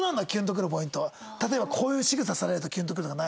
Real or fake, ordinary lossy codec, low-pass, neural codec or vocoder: real; none; none; none